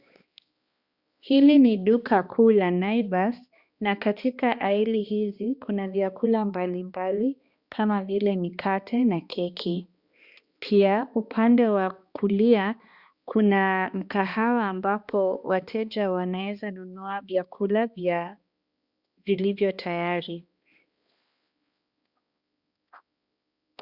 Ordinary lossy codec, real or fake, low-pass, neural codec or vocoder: Opus, 64 kbps; fake; 5.4 kHz; codec, 16 kHz, 2 kbps, X-Codec, HuBERT features, trained on balanced general audio